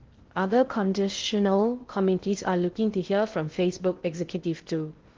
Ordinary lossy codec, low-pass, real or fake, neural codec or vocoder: Opus, 16 kbps; 7.2 kHz; fake; codec, 16 kHz in and 24 kHz out, 0.6 kbps, FocalCodec, streaming, 2048 codes